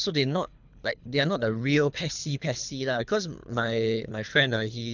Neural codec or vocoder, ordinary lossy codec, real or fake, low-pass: codec, 24 kHz, 3 kbps, HILCodec; none; fake; 7.2 kHz